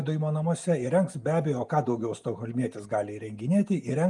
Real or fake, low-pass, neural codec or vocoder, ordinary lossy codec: real; 10.8 kHz; none; Opus, 32 kbps